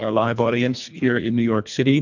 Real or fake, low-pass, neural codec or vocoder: fake; 7.2 kHz; codec, 24 kHz, 1.5 kbps, HILCodec